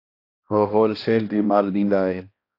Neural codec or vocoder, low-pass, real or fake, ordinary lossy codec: codec, 16 kHz, 1 kbps, X-Codec, HuBERT features, trained on balanced general audio; 5.4 kHz; fake; AAC, 32 kbps